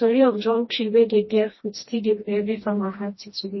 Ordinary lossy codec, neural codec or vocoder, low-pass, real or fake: MP3, 24 kbps; codec, 16 kHz, 1 kbps, FreqCodec, smaller model; 7.2 kHz; fake